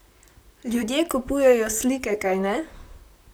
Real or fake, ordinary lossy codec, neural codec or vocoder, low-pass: fake; none; vocoder, 44.1 kHz, 128 mel bands, Pupu-Vocoder; none